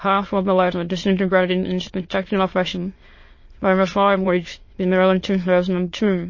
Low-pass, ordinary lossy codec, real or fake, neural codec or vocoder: 7.2 kHz; MP3, 32 kbps; fake; autoencoder, 22.05 kHz, a latent of 192 numbers a frame, VITS, trained on many speakers